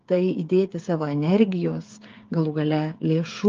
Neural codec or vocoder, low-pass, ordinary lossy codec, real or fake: codec, 16 kHz, 8 kbps, FreqCodec, smaller model; 7.2 kHz; Opus, 32 kbps; fake